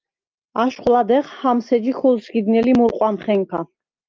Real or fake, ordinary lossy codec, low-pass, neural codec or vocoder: real; Opus, 24 kbps; 7.2 kHz; none